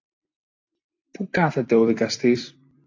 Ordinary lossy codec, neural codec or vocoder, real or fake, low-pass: AAC, 48 kbps; vocoder, 44.1 kHz, 128 mel bands every 256 samples, BigVGAN v2; fake; 7.2 kHz